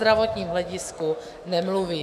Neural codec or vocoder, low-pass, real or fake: autoencoder, 48 kHz, 128 numbers a frame, DAC-VAE, trained on Japanese speech; 14.4 kHz; fake